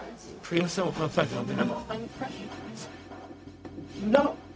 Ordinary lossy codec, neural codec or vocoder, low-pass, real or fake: none; codec, 16 kHz, 0.4 kbps, LongCat-Audio-Codec; none; fake